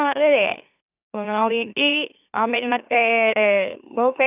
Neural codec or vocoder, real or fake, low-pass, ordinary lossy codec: autoencoder, 44.1 kHz, a latent of 192 numbers a frame, MeloTTS; fake; 3.6 kHz; none